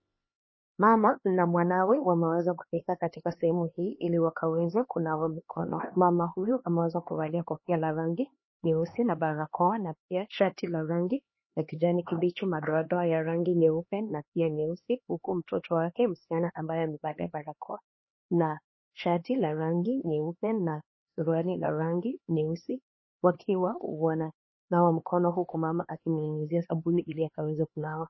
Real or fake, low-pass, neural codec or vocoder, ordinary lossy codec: fake; 7.2 kHz; codec, 16 kHz, 2 kbps, X-Codec, HuBERT features, trained on LibriSpeech; MP3, 24 kbps